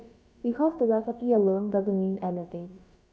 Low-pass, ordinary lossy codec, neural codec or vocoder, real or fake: none; none; codec, 16 kHz, about 1 kbps, DyCAST, with the encoder's durations; fake